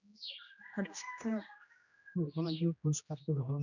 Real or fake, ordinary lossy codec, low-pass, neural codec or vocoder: fake; none; 7.2 kHz; codec, 16 kHz, 1 kbps, X-Codec, HuBERT features, trained on general audio